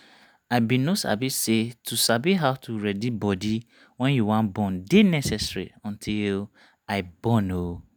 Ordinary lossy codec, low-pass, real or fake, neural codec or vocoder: none; none; real; none